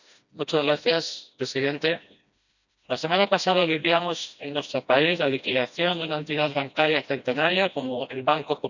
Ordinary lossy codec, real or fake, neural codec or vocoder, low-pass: none; fake; codec, 16 kHz, 1 kbps, FreqCodec, smaller model; 7.2 kHz